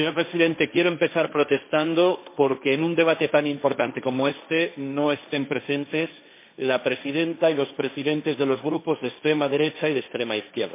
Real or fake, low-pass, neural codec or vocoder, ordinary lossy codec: fake; 3.6 kHz; codec, 16 kHz, 1.1 kbps, Voila-Tokenizer; MP3, 24 kbps